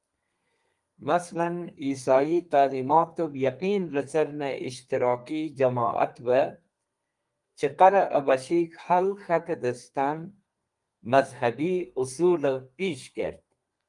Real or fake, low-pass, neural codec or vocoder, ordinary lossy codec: fake; 10.8 kHz; codec, 32 kHz, 1.9 kbps, SNAC; Opus, 32 kbps